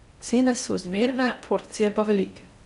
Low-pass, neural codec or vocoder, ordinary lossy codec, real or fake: 10.8 kHz; codec, 16 kHz in and 24 kHz out, 0.6 kbps, FocalCodec, streaming, 4096 codes; none; fake